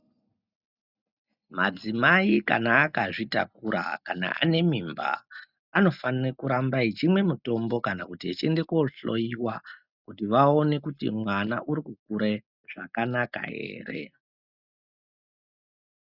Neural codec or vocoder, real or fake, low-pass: none; real; 5.4 kHz